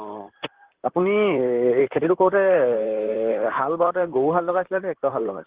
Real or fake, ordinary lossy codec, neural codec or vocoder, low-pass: fake; Opus, 16 kbps; vocoder, 44.1 kHz, 128 mel bands, Pupu-Vocoder; 3.6 kHz